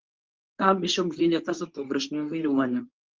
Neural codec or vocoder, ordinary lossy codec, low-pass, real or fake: codec, 24 kHz, 0.9 kbps, WavTokenizer, medium speech release version 2; Opus, 32 kbps; 7.2 kHz; fake